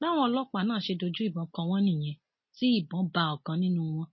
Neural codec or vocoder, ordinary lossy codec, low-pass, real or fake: none; MP3, 24 kbps; 7.2 kHz; real